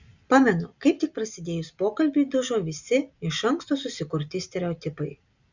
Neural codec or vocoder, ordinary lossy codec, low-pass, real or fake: none; Opus, 64 kbps; 7.2 kHz; real